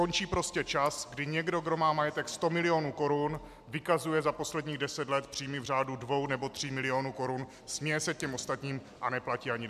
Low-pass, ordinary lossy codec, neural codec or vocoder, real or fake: 14.4 kHz; MP3, 96 kbps; none; real